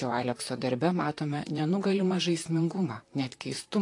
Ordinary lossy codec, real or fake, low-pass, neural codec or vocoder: AAC, 48 kbps; fake; 10.8 kHz; vocoder, 44.1 kHz, 128 mel bands, Pupu-Vocoder